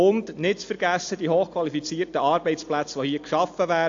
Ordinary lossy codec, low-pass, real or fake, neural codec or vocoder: AAC, 48 kbps; 7.2 kHz; real; none